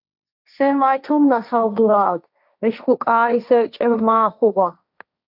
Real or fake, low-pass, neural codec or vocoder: fake; 5.4 kHz; codec, 16 kHz, 1.1 kbps, Voila-Tokenizer